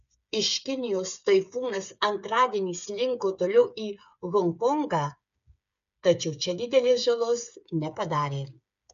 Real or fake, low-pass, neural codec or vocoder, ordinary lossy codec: fake; 7.2 kHz; codec, 16 kHz, 8 kbps, FreqCodec, smaller model; MP3, 96 kbps